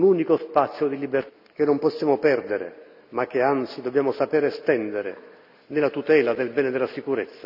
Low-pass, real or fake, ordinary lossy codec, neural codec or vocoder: 5.4 kHz; real; none; none